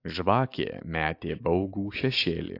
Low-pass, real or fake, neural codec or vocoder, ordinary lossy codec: 5.4 kHz; real; none; AAC, 32 kbps